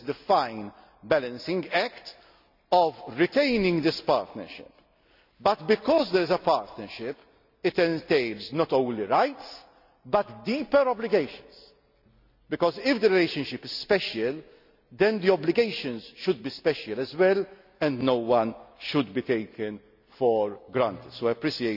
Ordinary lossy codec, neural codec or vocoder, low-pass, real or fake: none; none; 5.4 kHz; real